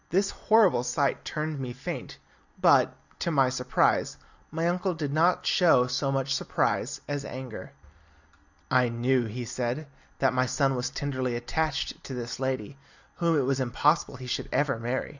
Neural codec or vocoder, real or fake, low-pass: none; real; 7.2 kHz